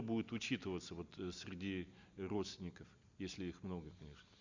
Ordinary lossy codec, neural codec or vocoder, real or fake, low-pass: Opus, 64 kbps; none; real; 7.2 kHz